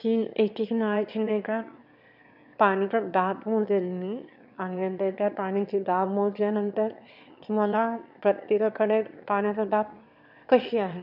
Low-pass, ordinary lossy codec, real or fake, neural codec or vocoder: 5.4 kHz; none; fake; autoencoder, 22.05 kHz, a latent of 192 numbers a frame, VITS, trained on one speaker